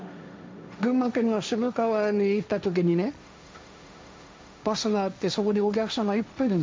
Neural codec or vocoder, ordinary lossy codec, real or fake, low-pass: codec, 16 kHz, 1.1 kbps, Voila-Tokenizer; none; fake; none